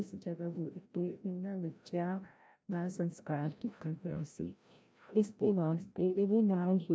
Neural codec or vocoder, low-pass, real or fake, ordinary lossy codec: codec, 16 kHz, 0.5 kbps, FreqCodec, larger model; none; fake; none